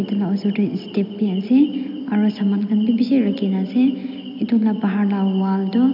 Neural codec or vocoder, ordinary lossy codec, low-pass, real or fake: none; AAC, 48 kbps; 5.4 kHz; real